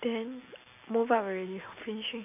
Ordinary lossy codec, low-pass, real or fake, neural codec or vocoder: none; 3.6 kHz; real; none